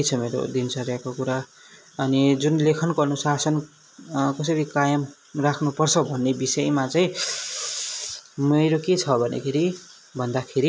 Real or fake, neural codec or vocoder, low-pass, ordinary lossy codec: real; none; none; none